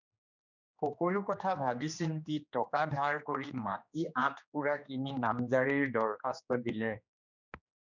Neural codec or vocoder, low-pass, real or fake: codec, 16 kHz, 2 kbps, X-Codec, HuBERT features, trained on general audio; 7.2 kHz; fake